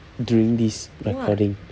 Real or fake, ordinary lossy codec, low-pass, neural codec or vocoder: real; none; none; none